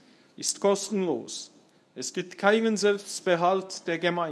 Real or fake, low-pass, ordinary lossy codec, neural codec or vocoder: fake; none; none; codec, 24 kHz, 0.9 kbps, WavTokenizer, medium speech release version 1